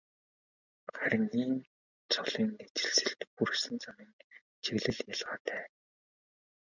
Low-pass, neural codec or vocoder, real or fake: 7.2 kHz; none; real